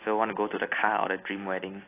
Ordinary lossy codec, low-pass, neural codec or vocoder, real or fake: AAC, 24 kbps; 3.6 kHz; none; real